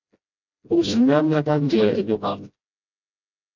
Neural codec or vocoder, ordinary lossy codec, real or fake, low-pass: codec, 16 kHz, 0.5 kbps, FreqCodec, smaller model; AAC, 48 kbps; fake; 7.2 kHz